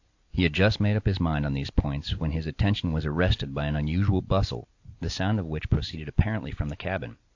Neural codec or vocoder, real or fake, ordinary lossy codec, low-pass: none; real; AAC, 48 kbps; 7.2 kHz